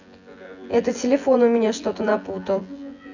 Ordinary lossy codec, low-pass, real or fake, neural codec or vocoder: none; 7.2 kHz; fake; vocoder, 24 kHz, 100 mel bands, Vocos